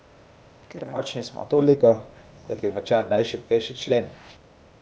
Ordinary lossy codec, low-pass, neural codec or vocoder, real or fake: none; none; codec, 16 kHz, 0.8 kbps, ZipCodec; fake